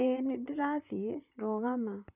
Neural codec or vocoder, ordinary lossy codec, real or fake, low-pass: vocoder, 44.1 kHz, 128 mel bands, Pupu-Vocoder; none; fake; 3.6 kHz